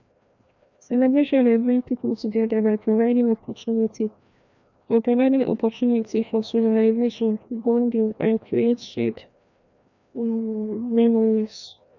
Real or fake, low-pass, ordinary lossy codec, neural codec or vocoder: fake; 7.2 kHz; none; codec, 16 kHz, 1 kbps, FreqCodec, larger model